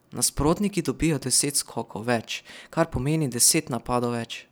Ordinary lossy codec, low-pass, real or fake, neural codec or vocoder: none; none; real; none